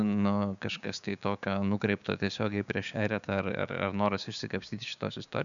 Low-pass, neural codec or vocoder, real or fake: 7.2 kHz; codec, 16 kHz, 6 kbps, DAC; fake